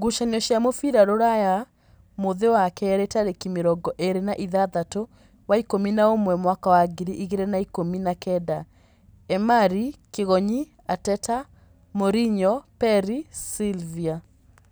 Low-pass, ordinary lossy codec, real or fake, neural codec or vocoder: none; none; real; none